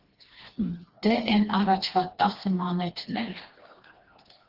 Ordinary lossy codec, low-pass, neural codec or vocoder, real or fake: Opus, 16 kbps; 5.4 kHz; codec, 16 kHz, 2 kbps, FreqCodec, smaller model; fake